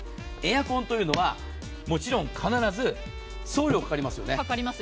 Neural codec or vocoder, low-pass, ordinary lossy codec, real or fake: none; none; none; real